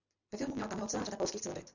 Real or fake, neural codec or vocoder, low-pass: real; none; 7.2 kHz